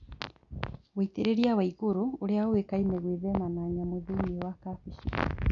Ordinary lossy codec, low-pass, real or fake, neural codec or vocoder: none; 7.2 kHz; real; none